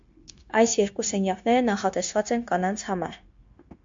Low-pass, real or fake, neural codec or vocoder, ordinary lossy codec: 7.2 kHz; fake; codec, 16 kHz, 0.9 kbps, LongCat-Audio-Codec; MP3, 48 kbps